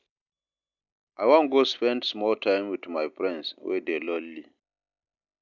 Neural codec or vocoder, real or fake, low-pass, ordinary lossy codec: none; real; 7.2 kHz; none